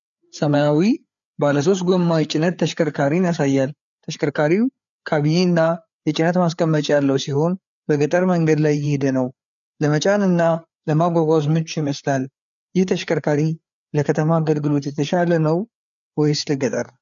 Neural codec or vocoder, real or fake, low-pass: codec, 16 kHz, 4 kbps, FreqCodec, larger model; fake; 7.2 kHz